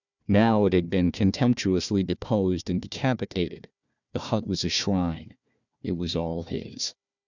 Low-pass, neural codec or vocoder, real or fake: 7.2 kHz; codec, 16 kHz, 1 kbps, FunCodec, trained on Chinese and English, 50 frames a second; fake